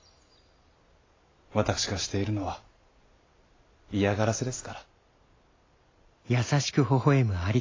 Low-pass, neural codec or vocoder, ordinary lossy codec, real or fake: 7.2 kHz; none; AAC, 32 kbps; real